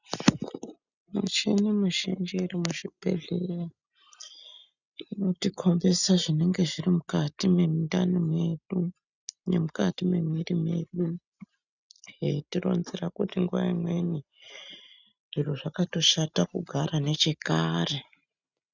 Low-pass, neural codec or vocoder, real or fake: 7.2 kHz; none; real